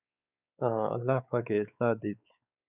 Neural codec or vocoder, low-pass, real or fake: codec, 16 kHz, 4 kbps, X-Codec, WavLM features, trained on Multilingual LibriSpeech; 3.6 kHz; fake